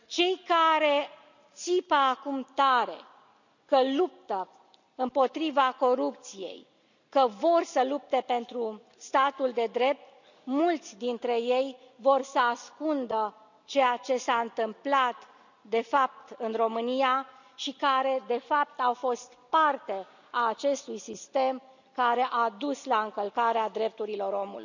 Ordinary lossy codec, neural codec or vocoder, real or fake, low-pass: none; none; real; 7.2 kHz